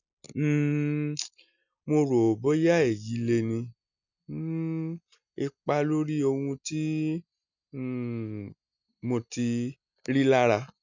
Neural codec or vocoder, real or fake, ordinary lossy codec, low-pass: none; real; none; 7.2 kHz